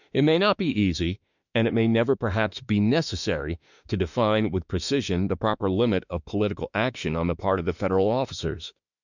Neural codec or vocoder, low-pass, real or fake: autoencoder, 48 kHz, 32 numbers a frame, DAC-VAE, trained on Japanese speech; 7.2 kHz; fake